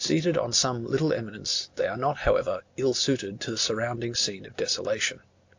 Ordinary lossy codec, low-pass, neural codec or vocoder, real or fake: AAC, 48 kbps; 7.2 kHz; none; real